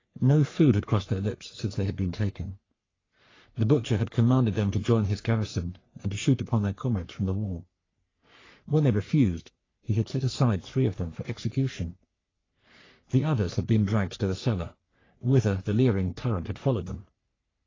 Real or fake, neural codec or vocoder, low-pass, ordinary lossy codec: fake; codec, 44.1 kHz, 3.4 kbps, Pupu-Codec; 7.2 kHz; AAC, 32 kbps